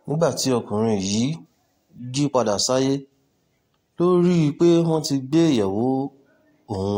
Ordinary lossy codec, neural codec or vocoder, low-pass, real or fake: AAC, 48 kbps; none; 19.8 kHz; real